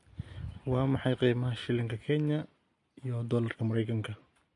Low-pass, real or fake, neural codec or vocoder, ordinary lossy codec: 10.8 kHz; real; none; MP3, 48 kbps